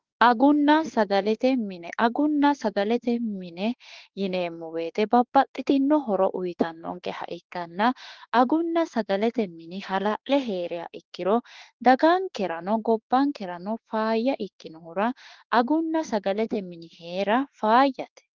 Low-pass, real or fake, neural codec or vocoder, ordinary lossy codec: 7.2 kHz; fake; autoencoder, 48 kHz, 32 numbers a frame, DAC-VAE, trained on Japanese speech; Opus, 16 kbps